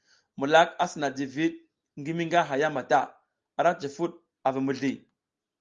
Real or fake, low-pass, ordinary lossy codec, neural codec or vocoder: real; 7.2 kHz; Opus, 32 kbps; none